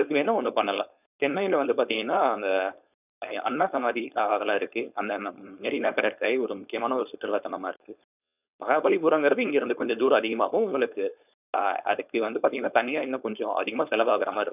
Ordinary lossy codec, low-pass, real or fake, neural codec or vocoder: none; 3.6 kHz; fake; codec, 16 kHz, 4.8 kbps, FACodec